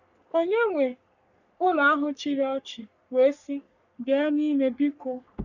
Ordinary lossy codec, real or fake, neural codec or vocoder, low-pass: none; fake; codec, 44.1 kHz, 3.4 kbps, Pupu-Codec; 7.2 kHz